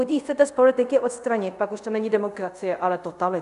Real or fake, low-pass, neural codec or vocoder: fake; 10.8 kHz; codec, 24 kHz, 0.5 kbps, DualCodec